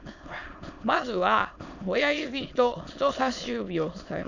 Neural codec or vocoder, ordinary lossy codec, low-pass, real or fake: autoencoder, 22.05 kHz, a latent of 192 numbers a frame, VITS, trained on many speakers; none; 7.2 kHz; fake